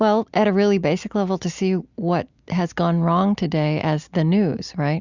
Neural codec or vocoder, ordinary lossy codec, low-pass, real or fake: none; Opus, 64 kbps; 7.2 kHz; real